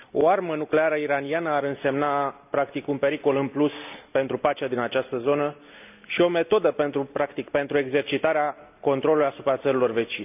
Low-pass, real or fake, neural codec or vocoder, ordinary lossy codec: 3.6 kHz; real; none; none